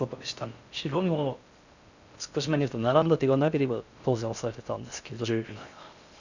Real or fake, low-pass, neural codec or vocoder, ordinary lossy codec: fake; 7.2 kHz; codec, 16 kHz in and 24 kHz out, 0.6 kbps, FocalCodec, streaming, 4096 codes; none